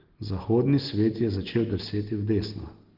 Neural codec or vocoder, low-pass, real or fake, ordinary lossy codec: none; 5.4 kHz; real; Opus, 16 kbps